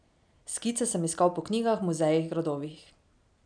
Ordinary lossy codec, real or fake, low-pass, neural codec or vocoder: none; real; 9.9 kHz; none